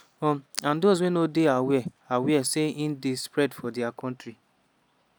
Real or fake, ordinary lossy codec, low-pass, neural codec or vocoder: real; none; none; none